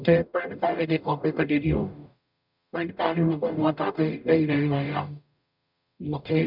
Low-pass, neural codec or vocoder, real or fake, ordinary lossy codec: 5.4 kHz; codec, 44.1 kHz, 0.9 kbps, DAC; fake; none